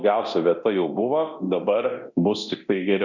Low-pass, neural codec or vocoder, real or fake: 7.2 kHz; codec, 24 kHz, 0.9 kbps, DualCodec; fake